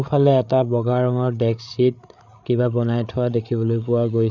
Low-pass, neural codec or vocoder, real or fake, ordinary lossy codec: 7.2 kHz; codec, 16 kHz, 8 kbps, FreqCodec, larger model; fake; none